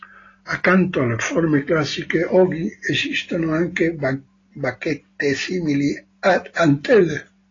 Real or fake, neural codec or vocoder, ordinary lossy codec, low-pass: real; none; AAC, 32 kbps; 7.2 kHz